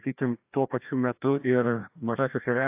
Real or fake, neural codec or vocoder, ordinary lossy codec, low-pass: fake; codec, 16 kHz, 1 kbps, FreqCodec, larger model; AAC, 32 kbps; 3.6 kHz